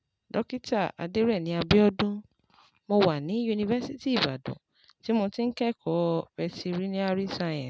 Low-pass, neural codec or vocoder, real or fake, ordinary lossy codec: none; none; real; none